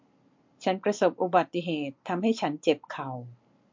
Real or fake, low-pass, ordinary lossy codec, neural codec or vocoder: real; 7.2 kHz; MP3, 48 kbps; none